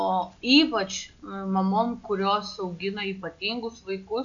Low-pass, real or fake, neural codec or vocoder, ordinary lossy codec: 7.2 kHz; real; none; MP3, 64 kbps